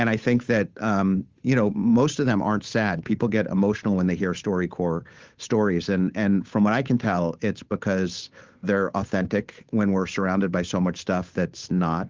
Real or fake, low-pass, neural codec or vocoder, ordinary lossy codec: fake; 7.2 kHz; codec, 16 kHz, 8 kbps, FunCodec, trained on Chinese and English, 25 frames a second; Opus, 32 kbps